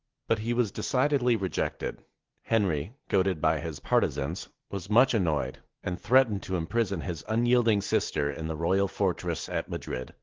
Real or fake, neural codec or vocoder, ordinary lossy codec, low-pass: real; none; Opus, 16 kbps; 7.2 kHz